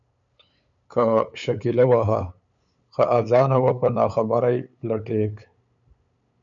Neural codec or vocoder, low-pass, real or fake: codec, 16 kHz, 8 kbps, FunCodec, trained on LibriTTS, 25 frames a second; 7.2 kHz; fake